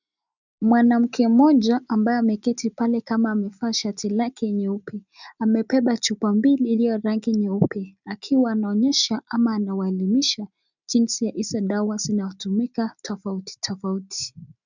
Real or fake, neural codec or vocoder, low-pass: real; none; 7.2 kHz